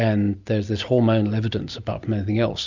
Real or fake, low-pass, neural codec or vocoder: real; 7.2 kHz; none